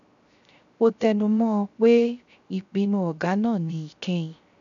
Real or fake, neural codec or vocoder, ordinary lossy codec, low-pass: fake; codec, 16 kHz, 0.3 kbps, FocalCodec; none; 7.2 kHz